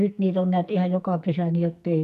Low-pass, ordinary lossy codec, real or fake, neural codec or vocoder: 14.4 kHz; none; fake; codec, 44.1 kHz, 2.6 kbps, SNAC